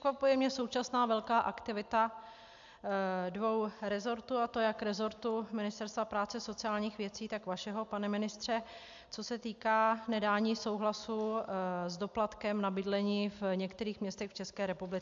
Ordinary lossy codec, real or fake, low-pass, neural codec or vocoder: AAC, 64 kbps; real; 7.2 kHz; none